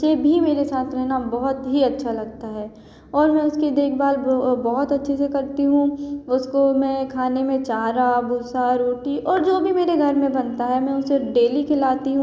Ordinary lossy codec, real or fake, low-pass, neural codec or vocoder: none; real; none; none